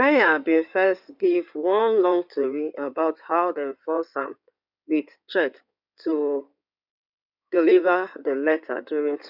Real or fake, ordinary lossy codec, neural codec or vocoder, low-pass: fake; none; codec, 16 kHz in and 24 kHz out, 2.2 kbps, FireRedTTS-2 codec; 5.4 kHz